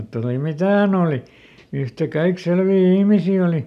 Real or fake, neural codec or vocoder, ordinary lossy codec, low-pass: real; none; none; 14.4 kHz